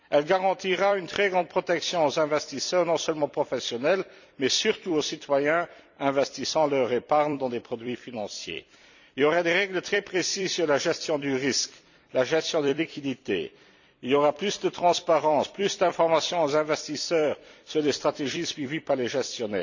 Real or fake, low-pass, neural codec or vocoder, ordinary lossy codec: fake; 7.2 kHz; vocoder, 44.1 kHz, 128 mel bands every 256 samples, BigVGAN v2; none